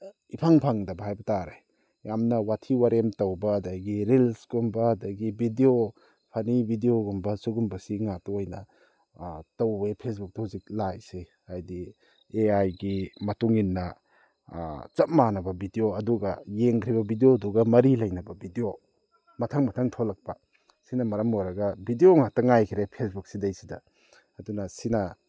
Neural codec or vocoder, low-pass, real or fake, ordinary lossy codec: none; none; real; none